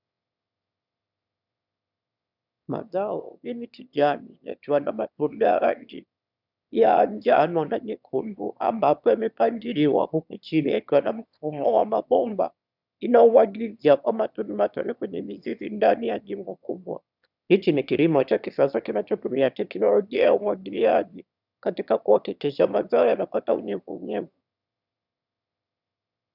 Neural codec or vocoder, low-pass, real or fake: autoencoder, 22.05 kHz, a latent of 192 numbers a frame, VITS, trained on one speaker; 5.4 kHz; fake